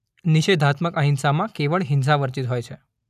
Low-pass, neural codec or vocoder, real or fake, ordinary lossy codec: 14.4 kHz; none; real; none